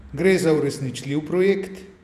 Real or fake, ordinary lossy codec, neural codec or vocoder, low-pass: fake; none; vocoder, 48 kHz, 128 mel bands, Vocos; 14.4 kHz